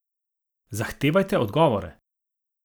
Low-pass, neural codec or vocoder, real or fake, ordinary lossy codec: none; none; real; none